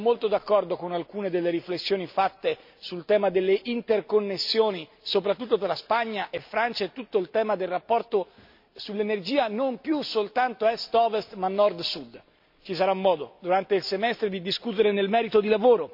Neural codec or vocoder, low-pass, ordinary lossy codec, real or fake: none; 5.4 kHz; MP3, 48 kbps; real